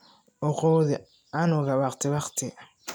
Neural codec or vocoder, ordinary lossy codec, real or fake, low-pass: none; none; real; none